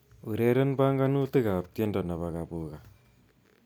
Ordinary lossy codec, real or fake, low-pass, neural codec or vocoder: none; real; none; none